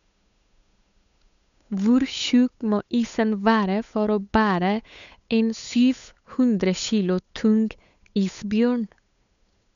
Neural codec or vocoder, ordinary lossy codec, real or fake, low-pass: codec, 16 kHz, 8 kbps, FunCodec, trained on Chinese and English, 25 frames a second; none; fake; 7.2 kHz